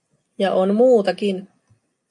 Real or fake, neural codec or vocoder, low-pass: real; none; 10.8 kHz